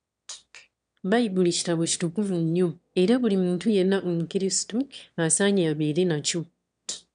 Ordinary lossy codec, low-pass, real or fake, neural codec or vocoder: none; 9.9 kHz; fake; autoencoder, 22.05 kHz, a latent of 192 numbers a frame, VITS, trained on one speaker